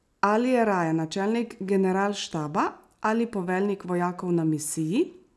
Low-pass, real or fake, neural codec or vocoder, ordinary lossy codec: none; real; none; none